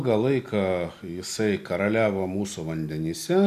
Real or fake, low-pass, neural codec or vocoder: real; 14.4 kHz; none